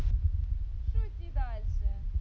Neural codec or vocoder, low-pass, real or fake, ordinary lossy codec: none; none; real; none